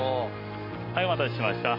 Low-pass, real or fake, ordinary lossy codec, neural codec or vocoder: 5.4 kHz; real; Opus, 64 kbps; none